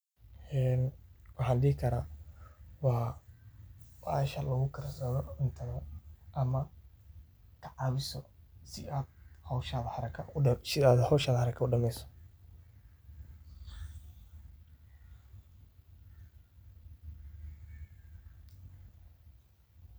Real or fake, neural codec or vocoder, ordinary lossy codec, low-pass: real; none; none; none